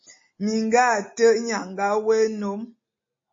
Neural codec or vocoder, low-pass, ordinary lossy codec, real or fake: none; 7.2 kHz; MP3, 32 kbps; real